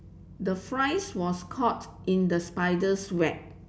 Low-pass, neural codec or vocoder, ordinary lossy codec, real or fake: none; none; none; real